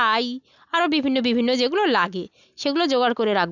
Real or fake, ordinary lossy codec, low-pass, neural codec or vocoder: real; none; 7.2 kHz; none